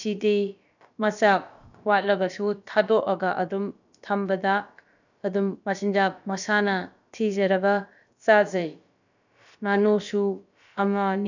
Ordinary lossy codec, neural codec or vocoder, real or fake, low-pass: none; codec, 16 kHz, about 1 kbps, DyCAST, with the encoder's durations; fake; 7.2 kHz